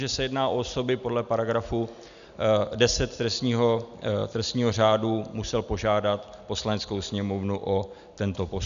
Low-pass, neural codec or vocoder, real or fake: 7.2 kHz; none; real